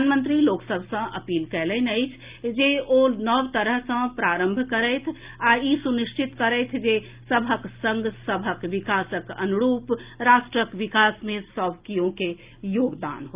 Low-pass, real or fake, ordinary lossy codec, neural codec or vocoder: 3.6 kHz; real; Opus, 24 kbps; none